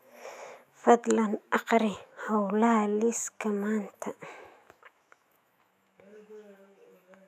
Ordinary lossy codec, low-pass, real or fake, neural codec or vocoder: none; 14.4 kHz; fake; autoencoder, 48 kHz, 128 numbers a frame, DAC-VAE, trained on Japanese speech